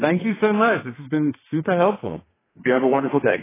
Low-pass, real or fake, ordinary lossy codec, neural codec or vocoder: 3.6 kHz; fake; AAC, 16 kbps; codec, 32 kHz, 1.9 kbps, SNAC